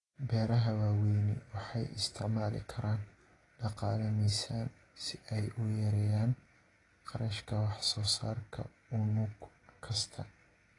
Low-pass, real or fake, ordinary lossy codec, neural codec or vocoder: 10.8 kHz; real; AAC, 32 kbps; none